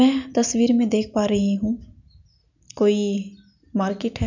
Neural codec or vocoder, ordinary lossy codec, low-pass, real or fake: none; none; 7.2 kHz; real